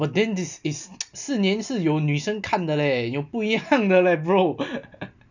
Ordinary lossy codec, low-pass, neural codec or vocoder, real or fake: none; 7.2 kHz; none; real